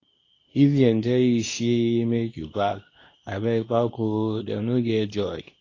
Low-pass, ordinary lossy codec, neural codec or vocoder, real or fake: 7.2 kHz; AAC, 32 kbps; codec, 24 kHz, 0.9 kbps, WavTokenizer, medium speech release version 2; fake